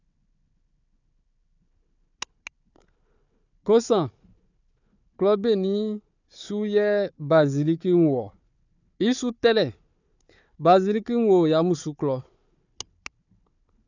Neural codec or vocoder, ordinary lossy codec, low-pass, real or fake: codec, 16 kHz, 4 kbps, FunCodec, trained on Chinese and English, 50 frames a second; none; 7.2 kHz; fake